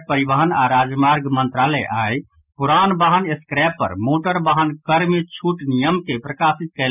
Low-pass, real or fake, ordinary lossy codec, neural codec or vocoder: 3.6 kHz; real; none; none